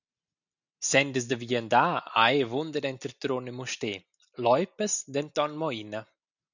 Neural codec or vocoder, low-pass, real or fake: none; 7.2 kHz; real